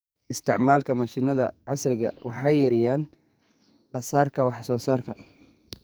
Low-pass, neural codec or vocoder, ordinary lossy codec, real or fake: none; codec, 44.1 kHz, 2.6 kbps, SNAC; none; fake